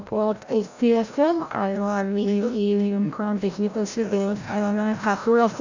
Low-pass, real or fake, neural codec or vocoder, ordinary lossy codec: 7.2 kHz; fake; codec, 16 kHz, 0.5 kbps, FreqCodec, larger model; none